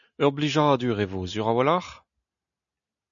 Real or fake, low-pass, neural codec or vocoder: real; 7.2 kHz; none